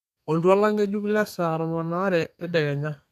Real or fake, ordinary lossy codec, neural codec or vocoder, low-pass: fake; none; codec, 32 kHz, 1.9 kbps, SNAC; 14.4 kHz